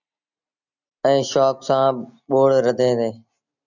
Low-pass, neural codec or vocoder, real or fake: 7.2 kHz; none; real